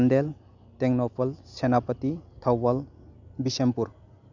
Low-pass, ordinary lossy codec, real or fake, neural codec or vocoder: 7.2 kHz; none; real; none